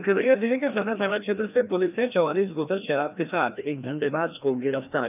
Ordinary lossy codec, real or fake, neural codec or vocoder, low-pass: none; fake; codec, 16 kHz, 1 kbps, FreqCodec, larger model; 3.6 kHz